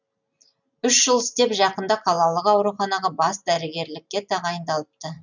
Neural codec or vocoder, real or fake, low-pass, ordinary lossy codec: none; real; 7.2 kHz; none